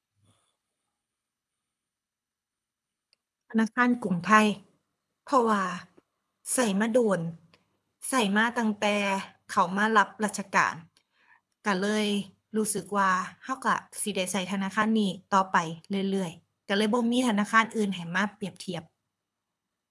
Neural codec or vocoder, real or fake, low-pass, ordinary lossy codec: codec, 24 kHz, 6 kbps, HILCodec; fake; none; none